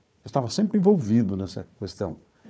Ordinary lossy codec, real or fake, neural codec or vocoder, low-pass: none; fake; codec, 16 kHz, 4 kbps, FunCodec, trained on Chinese and English, 50 frames a second; none